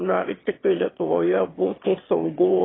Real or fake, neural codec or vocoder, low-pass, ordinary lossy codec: fake; autoencoder, 22.05 kHz, a latent of 192 numbers a frame, VITS, trained on one speaker; 7.2 kHz; AAC, 16 kbps